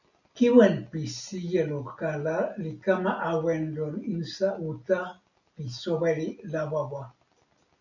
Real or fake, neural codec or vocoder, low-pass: real; none; 7.2 kHz